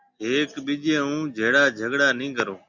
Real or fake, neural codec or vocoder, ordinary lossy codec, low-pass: real; none; Opus, 64 kbps; 7.2 kHz